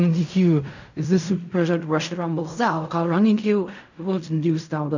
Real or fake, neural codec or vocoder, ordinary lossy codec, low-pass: fake; codec, 16 kHz in and 24 kHz out, 0.4 kbps, LongCat-Audio-Codec, fine tuned four codebook decoder; none; 7.2 kHz